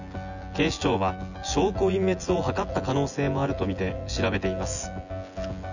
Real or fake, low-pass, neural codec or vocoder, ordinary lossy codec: fake; 7.2 kHz; vocoder, 24 kHz, 100 mel bands, Vocos; none